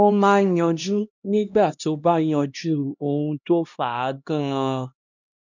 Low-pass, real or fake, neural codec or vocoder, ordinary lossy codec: 7.2 kHz; fake; codec, 16 kHz, 1 kbps, X-Codec, HuBERT features, trained on LibriSpeech; none